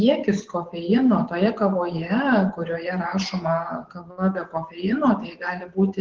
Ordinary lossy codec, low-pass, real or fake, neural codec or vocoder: Opus, 16 kbps; 7.2 kHz; fake; vocoder, 24 kHz, 100 mel bands, Vocos